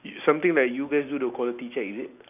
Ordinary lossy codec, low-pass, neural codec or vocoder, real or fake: none; 3.6 kHz; none; real